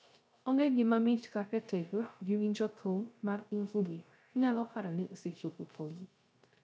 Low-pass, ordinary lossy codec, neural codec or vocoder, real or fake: none; none; codec, 16 kHz, 0.3 kbps, FocalCodec; fake